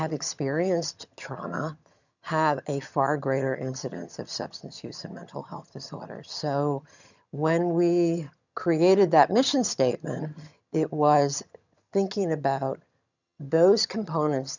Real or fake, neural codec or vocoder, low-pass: fake; vocoder, 22.05 kHz, 80 mel bands, HiFi-GAN; 7.2 kHz